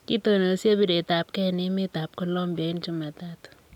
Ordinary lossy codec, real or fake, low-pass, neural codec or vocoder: none; real; 19.8 kHz; none